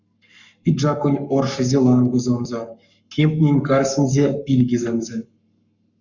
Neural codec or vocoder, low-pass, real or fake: codec, 44.1 kHz, 7.8 kbps, Pupu-Codec; 7.2 kHz; fake